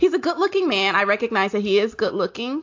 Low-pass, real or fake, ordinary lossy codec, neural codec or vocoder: 7.2 kHz; real; AAC, 48 kbps; none